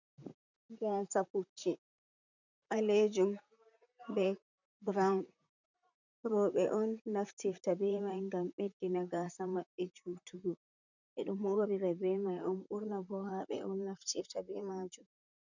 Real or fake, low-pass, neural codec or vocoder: fake; 7.2 kHz; vocoder, 44.1 kHz, 128 mel bands, Pupu-Vocoder